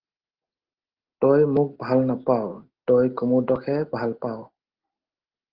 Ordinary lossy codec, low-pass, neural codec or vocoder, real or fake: Opus, 16 kbps; 5.4 kHz; none; real